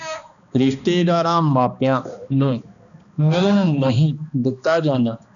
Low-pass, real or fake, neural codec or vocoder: 7.2 kHz; fake; codec, 16 kHz, 2 kbps, X-Codec, HuBERT features, trained on balanced general audio